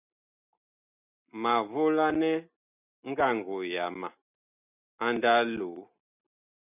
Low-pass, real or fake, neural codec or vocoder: 3.6 kHz; real; none